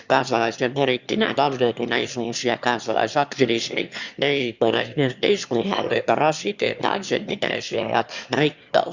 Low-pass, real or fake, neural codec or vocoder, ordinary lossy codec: 7.2 kHz; fake; autoencoder, 22.05 kHz, a latent of 192 numbers a frame, VITS, trained on one speaker; Opus, 64 kbps